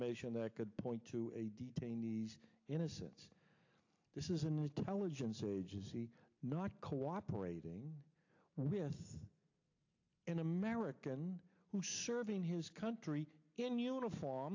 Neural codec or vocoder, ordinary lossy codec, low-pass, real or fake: none; AAC, 48 kbps; 7.2 kHz; real